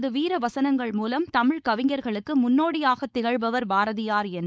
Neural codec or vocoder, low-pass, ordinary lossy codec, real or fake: codec, 16 kHz, 4.8 kbps, FACodec; none; none; fake